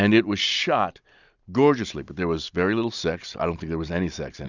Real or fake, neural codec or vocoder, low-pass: real; none; 7.2 kHz